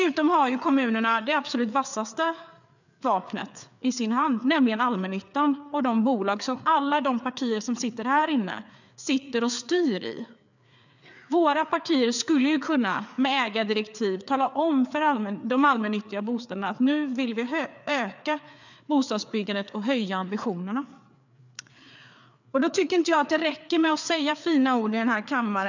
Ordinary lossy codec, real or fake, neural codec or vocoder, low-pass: none; fake; codec, 16 kHz, 4 kbps, FreqCodec, larger model; 7.2 kHz